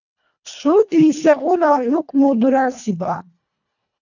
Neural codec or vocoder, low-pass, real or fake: codec, 24 kHz, 1.5 kbps, HILCodec; 7.2 kHz; fake